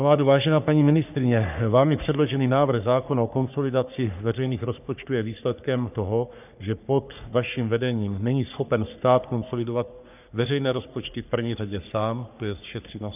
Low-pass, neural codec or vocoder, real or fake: 3.6 kHz; codec, 44.1 kHz, 3.4 kbps, Pupu-Codec; fake